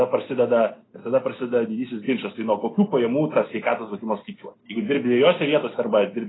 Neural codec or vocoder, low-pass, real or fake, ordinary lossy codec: none; 7.2 kHz; real; AAC, 16 kbps